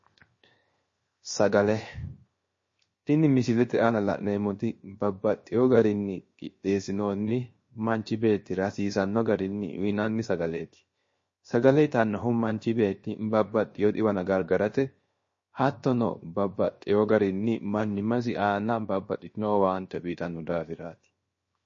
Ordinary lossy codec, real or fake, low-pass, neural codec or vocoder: MP3, 32 kbps; fake; 7.2 kHz; codec, 16 kHz, 0.7 kbps, FocalCodec